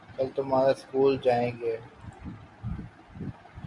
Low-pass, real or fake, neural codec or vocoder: 10.8 kHz; real; none